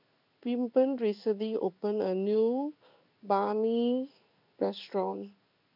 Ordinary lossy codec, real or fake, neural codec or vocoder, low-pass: none; fake; codec, 16 kHz in and 24 kHz out, 1 kbps, XY-Tokenizer; 5.4 kHz